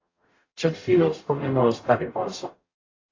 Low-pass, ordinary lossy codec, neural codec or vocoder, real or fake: 7.2 kHz; AAC, 32 kbps; codec, 44.1 kHz, 0.9 kbps, DAC; fake